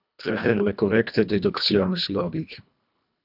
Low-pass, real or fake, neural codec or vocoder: 5.4 kHz; fake; codec, 24 kHz, 1.5 kbps, HILCodec